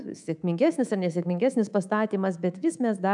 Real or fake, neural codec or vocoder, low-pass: fake; codec, 24 kHz, 3.1 kbps, DualCodec; 10.8 kHz